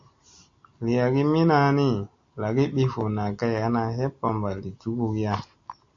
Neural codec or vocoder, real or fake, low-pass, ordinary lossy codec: none; real; 7.2 kHz; AAC, 48 kbps